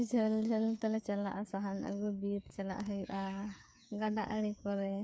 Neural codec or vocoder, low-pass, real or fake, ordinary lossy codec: codec, 16 kHz, 8 kbps, FreqCodec, smaller model; none; fake; none